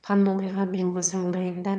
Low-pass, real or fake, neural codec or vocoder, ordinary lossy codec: 9.9 kHz; fake; autoencoder, 22.05 kHz, a latent of 192 numbers a frame, VITS, trained on one speaker; none